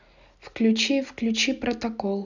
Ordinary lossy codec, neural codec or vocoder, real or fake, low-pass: none; none; real; 7.2 kHz